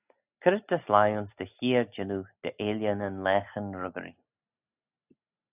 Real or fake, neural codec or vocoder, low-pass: real; none; 3.6 kHz